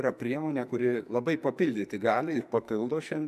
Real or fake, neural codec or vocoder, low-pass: fake; codec, 44.1 kHz, 2.6 kbps, SNAC; 14.4 kHz